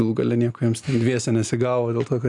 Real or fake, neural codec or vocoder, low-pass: real; none; 10.8 kHz